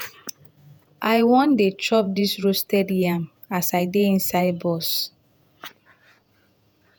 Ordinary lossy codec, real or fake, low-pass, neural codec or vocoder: none; fake; none; vocoder, 48 kHz, 128 mel bands, Vocos